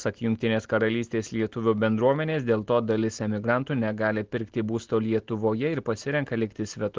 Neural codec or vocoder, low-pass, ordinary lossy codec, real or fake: none; 7.2 kHz; Opus, 16 kbps; real